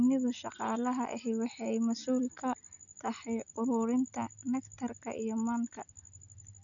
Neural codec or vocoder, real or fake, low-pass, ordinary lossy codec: none; real; 7.2 kHz; none